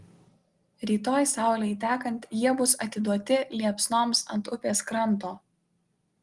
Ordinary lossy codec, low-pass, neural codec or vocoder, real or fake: Opus, 24 kbps; 10.8 kHz; none; real